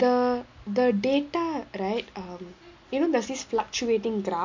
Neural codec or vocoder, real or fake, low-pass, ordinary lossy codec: none; real; 7.2 kHz; MP3, 48 kbps